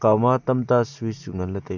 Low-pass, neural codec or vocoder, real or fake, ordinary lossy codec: 7.2 kHz; none; real; none